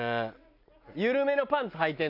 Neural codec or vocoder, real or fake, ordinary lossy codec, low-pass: none; real; none; 5.4 kHz